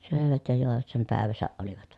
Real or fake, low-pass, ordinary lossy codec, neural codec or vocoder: fake; none; none; vocoder, 24 kHz, 100 mel bands, Vocos